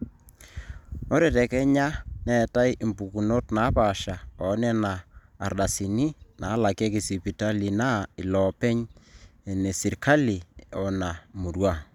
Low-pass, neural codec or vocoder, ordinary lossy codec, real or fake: 19.8 kHz; none; none; real